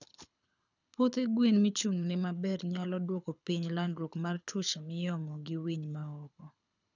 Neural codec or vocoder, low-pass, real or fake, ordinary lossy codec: codec, 24 kHz, 6 kbps, HILCodec; 7.2 kHz; fake; none